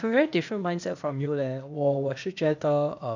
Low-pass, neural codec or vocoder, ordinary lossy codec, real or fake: 7.2 kHz; codec, 16 kHz, 0.8 kbps, ZipCodec; none; fake